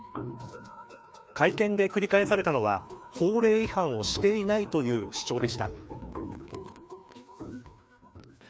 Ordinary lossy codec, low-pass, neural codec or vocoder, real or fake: none; none; codec, 16 kHz, 2 kbps, FreqCodec, larger model; fake